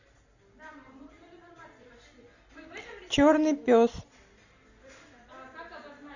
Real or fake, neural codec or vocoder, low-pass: real; none; 7.2 kHz